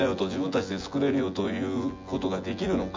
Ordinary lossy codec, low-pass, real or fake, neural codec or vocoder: MP3, 64 kbps; 7.2 kHz; fake; vocoder, 24 kHz, 100 mel bands, Vocos